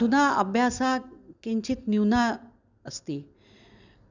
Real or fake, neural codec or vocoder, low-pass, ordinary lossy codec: real; none; 7.2 kHz; none